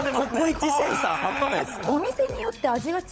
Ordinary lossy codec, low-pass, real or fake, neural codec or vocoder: none; none; fake; codec, 16 kHz, 16 kbps, FunCodec, trained on LibriTTS, 50 frames a second